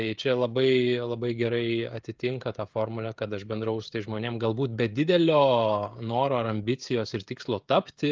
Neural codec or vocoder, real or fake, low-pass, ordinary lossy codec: codec, 16 kHz, 16 kbps, FreqCodec, smaller model; fake; 7.2 kHz; Opus, 32 kbps